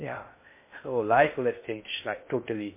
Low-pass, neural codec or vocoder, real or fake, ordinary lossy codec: 3.6 kHz; codec, 16 kHz in and 24 kHz out, 0.6 kbps, FocalCodec, streaming, 2048 codes; fake; MP3, 24 kbps